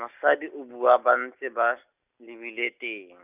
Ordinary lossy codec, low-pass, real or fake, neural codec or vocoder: none; 3.6 kHz; real; none